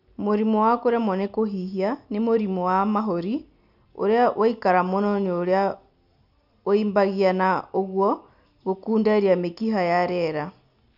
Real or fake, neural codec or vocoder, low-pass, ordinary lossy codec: real; none; 5.4 kHz; none